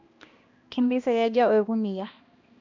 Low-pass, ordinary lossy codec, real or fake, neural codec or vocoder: 7.2 kHz; MP3, 48 kbps; fake; codec, 16 kHz, 1 kbps, X-Codec, HuBERT features, trained on balanced general audio